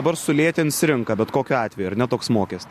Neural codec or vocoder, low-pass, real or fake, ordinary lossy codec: none; 14.4 kHz; real; MP3, 64 kbps